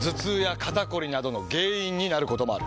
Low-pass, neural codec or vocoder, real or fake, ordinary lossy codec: none; none; real; none